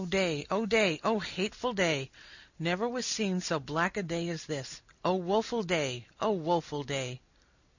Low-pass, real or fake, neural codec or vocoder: 7.2 kHz; real; none